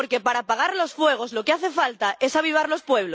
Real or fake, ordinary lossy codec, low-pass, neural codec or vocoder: real; none; none; none